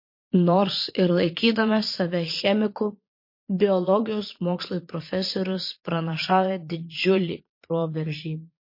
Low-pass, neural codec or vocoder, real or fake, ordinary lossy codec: 5.4 kHz; vocoder, 22.05 kHz, 80 mel bands, Vocos; fake; MP3, 32 kbps